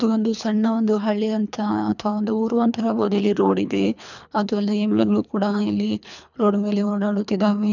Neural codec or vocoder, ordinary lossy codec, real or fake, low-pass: codec, 24 kHz, 3 kbps, HILCodec; none; fake; 7.2 kHz